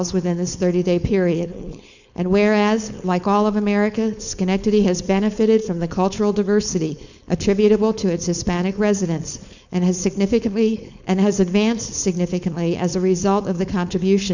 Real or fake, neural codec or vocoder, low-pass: fake; codec, 16 kHz, 4.8 kbps, FACodec; 7.2 kHz